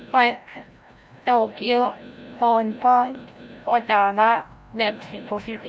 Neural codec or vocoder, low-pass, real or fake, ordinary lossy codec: codec, 16 kHz, 0.5 kbps, FreqCodec, larger model; none; fake; none